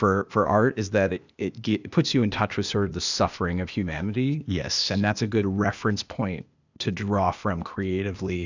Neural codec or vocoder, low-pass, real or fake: codec, 16 kHz, 0.8 kbps, ZipCodec; 7.2 kHz; fake